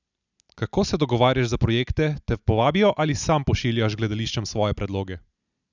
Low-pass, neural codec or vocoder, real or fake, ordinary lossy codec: 7.2 kHz; none; real; none